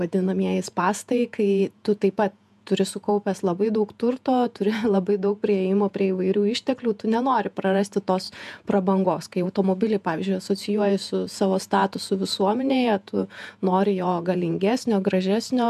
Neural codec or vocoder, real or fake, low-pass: vocoder, 48 kHz, 128 mel bands, Vocos; fake; 14.4 kHz